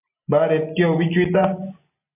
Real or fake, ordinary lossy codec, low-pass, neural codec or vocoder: real; MP3, 32 kbps; 3.6 kHz; none